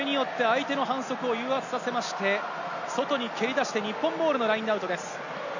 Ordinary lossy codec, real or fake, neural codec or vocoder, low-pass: none; real; none; 7.2 kHz